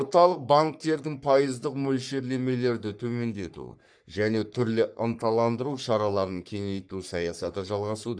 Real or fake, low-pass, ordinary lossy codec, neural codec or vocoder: fake; 9.9 kHz; none; codec, 44.1 kHz, 3.4 kbps, Pupu-Codec